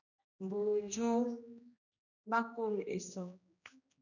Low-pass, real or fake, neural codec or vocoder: 7.2 kHz; fake; codec, 16 kHz, 1 kbps, X-Codec, HuBERT features, trained on general audio